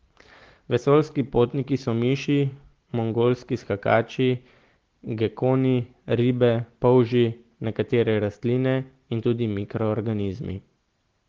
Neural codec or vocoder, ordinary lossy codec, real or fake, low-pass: none; Opus, 16 kbps; real; 7.2 kHz